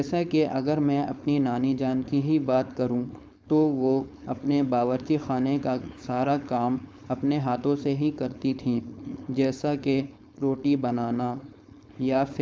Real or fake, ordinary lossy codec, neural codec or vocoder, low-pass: fake; none; codec, 16 kHz, 4.8 kbps, FACodec; none